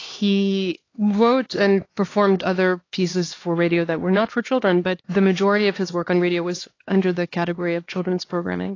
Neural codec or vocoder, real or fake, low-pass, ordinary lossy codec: codec, 16 kHz, 2 kbps, X-Codec, HuBERT features, trained on LibriSpeech; fake; 7.2 kHz; AAC, 32 kbps